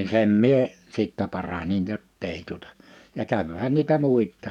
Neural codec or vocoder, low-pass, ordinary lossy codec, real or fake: codec, 44.1 kHz, 7.8 kbps, DAC; 19.8 kHz; none; fake